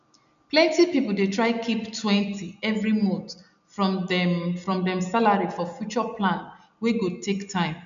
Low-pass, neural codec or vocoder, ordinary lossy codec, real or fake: 7.2 kHz; none; none; real